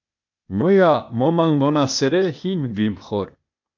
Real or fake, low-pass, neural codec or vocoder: fake; 7.2 kHz; codec, 16 kHz, 0.8 kbps, ZipCodec